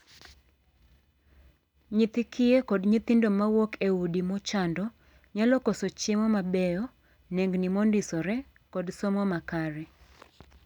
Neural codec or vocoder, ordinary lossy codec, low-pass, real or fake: none; none; 19.8 kHz; real